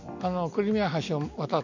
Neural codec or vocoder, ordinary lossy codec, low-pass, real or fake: none; none; 7.2 kHz; real